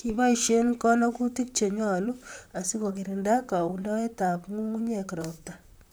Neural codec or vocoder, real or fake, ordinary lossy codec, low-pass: vocoder, 44.1 kHz, 128 mel bands, Pupu-Vocoder; fake; none; none